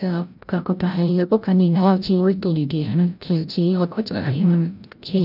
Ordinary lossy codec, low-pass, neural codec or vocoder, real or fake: none; 5.4 kHz; codec, 16 kHz, 0.5 kbps, FreqCodec, larger model; fake